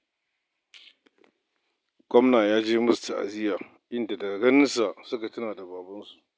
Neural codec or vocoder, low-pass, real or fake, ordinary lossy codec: none; none; real; none